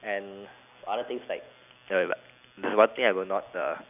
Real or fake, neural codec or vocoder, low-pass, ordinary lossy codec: real; none; 3.6 kHz; none